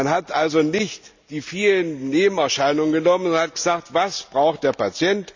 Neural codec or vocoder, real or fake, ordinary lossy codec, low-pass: none; real; Opus, 64 kbps; 7.2 kHz